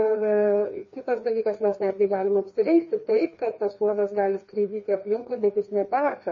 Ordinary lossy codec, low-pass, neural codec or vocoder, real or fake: MP3, 32 kbps; 7.2 kHz; codec, 16 kHz, 2 kbps, FreqCodec, larger model; fake